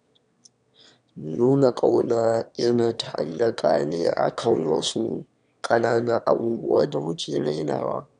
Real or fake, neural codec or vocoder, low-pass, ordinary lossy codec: fake; autoencoder, 22.05 kHz, a latent of 192 numbers a frame, VITS, trained on one speaker; 9.9 kHz; none